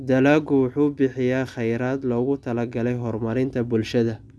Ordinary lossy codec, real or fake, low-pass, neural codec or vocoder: none; real; none; none